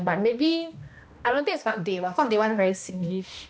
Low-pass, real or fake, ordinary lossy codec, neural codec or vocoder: none; fake; none; codec, 16 kHz, 1 kbps, X-Codec, HuBERT features, trained on balanced general audio